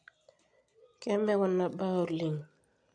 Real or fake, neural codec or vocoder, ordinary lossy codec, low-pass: real; none; MP3, 64 kbps; 9.9 kHz